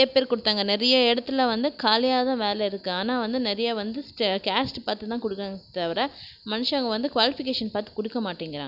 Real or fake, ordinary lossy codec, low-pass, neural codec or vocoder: real; none; 5.4 kHz; none